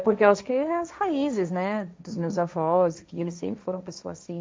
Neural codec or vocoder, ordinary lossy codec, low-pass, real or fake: codec, 16 kHz, 1.1 kbps, Voila-Tokenizer; none; none; fake